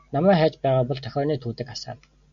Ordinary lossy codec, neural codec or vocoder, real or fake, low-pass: MP3, 96 kbps; none; real; 7.2 kHz